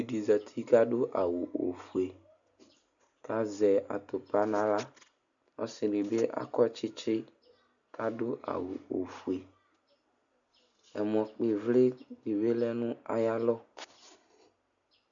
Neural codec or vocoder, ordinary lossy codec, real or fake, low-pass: none; MP3, 96 kbps; real; 7.2 kHz